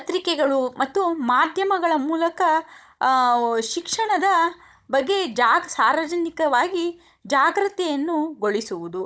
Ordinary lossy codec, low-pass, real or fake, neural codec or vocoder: none; none; fake; codec, 16 kHz, 16 kbps, FunCodec, trained on Chinese and English, 50 frames a second